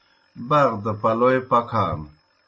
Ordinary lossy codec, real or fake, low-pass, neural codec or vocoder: MP3, 32 kbps; real; 9.9 kHz; none